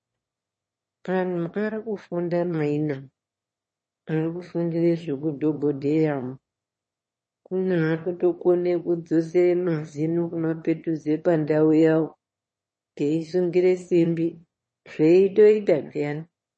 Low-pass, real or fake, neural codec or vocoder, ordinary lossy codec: 9.9 kHz; fake; autoencoder, 22.05 kHz, a latent of 192 numbers a frame, VITS, trained on one speaker; MP3, 32 kbps